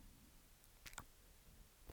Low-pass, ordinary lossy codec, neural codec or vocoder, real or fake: none; none; none; real